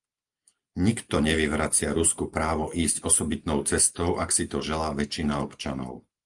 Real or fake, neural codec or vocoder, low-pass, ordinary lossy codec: real; none; 10.8 kHz; Opus, 32 kbps